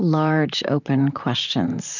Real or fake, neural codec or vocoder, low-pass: fake; vocoder, 44.1 kHz, 128 mel bands, Pupu-Vocoder; 7.2 kHz